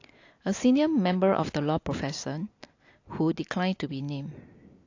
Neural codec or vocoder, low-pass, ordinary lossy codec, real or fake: none; 7.2 kHz; AAC, 48 kbps; real